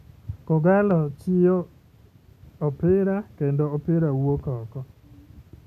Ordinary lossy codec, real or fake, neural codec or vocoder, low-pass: none; real; none; 14.4 kHz